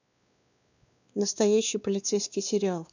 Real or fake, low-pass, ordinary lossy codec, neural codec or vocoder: fake; 7.2 kHz; none; codec, 16 kHz, 2 kbps, X-Codec, WavLM features, trained on Multilingual LibriSpeech